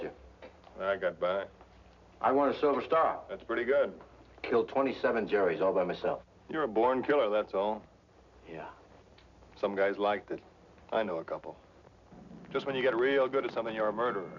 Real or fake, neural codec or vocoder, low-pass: real; none; 7.2 kHz